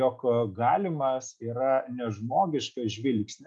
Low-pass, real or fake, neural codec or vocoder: 10.8 kHz; real; none